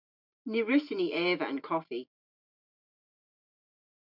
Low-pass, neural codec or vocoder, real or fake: 5.4 kHz; none; real